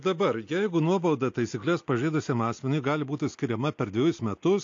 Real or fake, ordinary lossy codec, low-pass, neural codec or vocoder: real; AAC, 48 kbps; 7.2 kHz; none